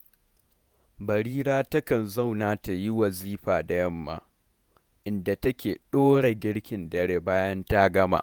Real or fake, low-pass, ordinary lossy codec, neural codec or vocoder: fake; none; none; vocoder, 48 kHz, 128 mel bands, Vocos